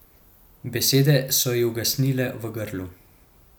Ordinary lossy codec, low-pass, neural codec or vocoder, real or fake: none; none; none; real